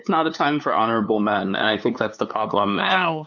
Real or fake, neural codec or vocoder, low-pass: fake; codec, 16 kHz, 2 kbps, FunCodec, trained on LibriTTS, 25 frames a second; 7.2 kHz